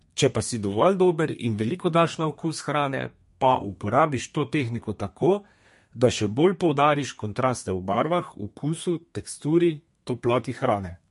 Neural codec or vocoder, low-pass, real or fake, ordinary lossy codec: codec, 32 kHz, 1.9 kbps, SNAC; 14.4 kHz; fake; MP3, 48 kbps